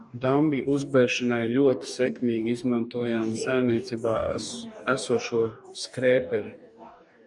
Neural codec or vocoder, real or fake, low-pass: codec, 44.1 kHz, 2.6 kbps, DAC; fake; 10.8 kHz